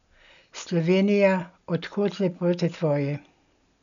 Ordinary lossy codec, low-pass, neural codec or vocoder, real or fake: none; 7.2 kHz; none; real